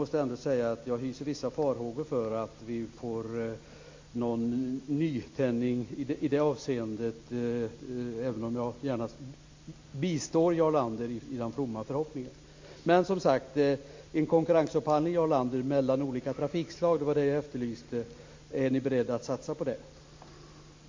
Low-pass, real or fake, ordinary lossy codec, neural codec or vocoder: 7.2 kHz; real; MP3, 48 kbps; none